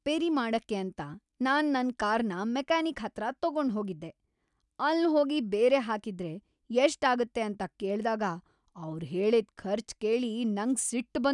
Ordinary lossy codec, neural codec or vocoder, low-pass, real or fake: none; none; 10.8 kHz; real